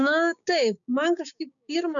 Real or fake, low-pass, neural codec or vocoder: fake; 7.2 kHz; codec, 16 kHz, 6 kbps, DAC